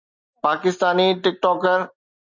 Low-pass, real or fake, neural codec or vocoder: 7.2 kHz; real; none